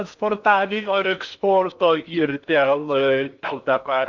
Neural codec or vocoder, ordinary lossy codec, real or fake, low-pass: codec, 16 kHz in and 24 kHz out, 0.8 kbps, FocalCodec, streaming, 65536 codes; MP3, 64 kbps; fake; 7.2 kHz